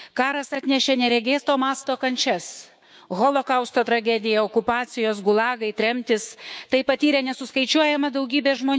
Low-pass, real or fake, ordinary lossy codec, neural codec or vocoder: none; fake; none; codec, 16 kHz, 6 kbps, DAC